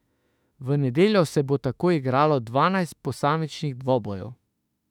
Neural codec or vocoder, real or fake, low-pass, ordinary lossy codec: autoencoder, 48 kHz, 32 numbers a frame, DAC-VAE, trained on Japanese speech; fake; 19.8 kHz; none